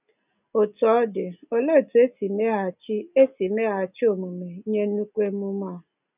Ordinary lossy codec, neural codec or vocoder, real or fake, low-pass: none; none; real; 3.6 kHz